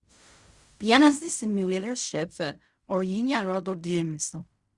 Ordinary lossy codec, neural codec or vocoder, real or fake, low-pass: Opus, 64 kbps; codec, 16 kHz in and 24 kHz out, 0.4 kbps, LongCat-Audio-Codec, fine tuned four codebook decoder; fake; 10.8 kHz